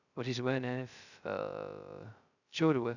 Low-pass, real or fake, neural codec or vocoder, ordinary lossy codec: 7.2 kHz; fake; codec, 16 kHz, 0.2 kbps, FocalCodec; none